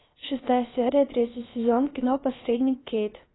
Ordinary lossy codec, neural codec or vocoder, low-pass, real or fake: AAC, 16 kbps; codec, 16 kHz, about 1 kbps, DyCAST, with the encoder's durations; 7.2 kHz; fake